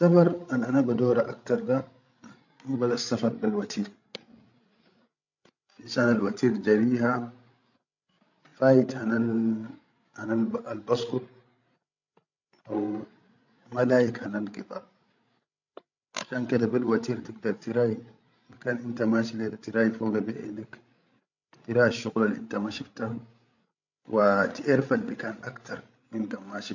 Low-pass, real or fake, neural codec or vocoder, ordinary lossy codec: 7.2 kHz; fake; codec, 16 kHz, 16 kbps, FreqCodec, larger model; none